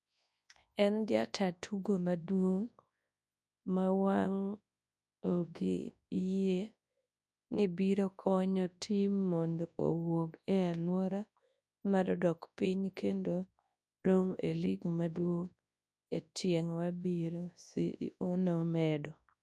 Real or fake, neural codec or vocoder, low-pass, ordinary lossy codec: fake; codec, 24 kHz, 0.9 kbps, WavTokenizer, large speech release; none; none